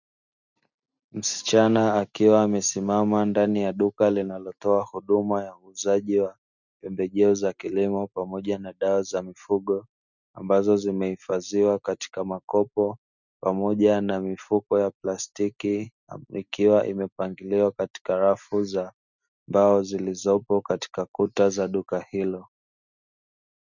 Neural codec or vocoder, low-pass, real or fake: none; 7.2 kHz; real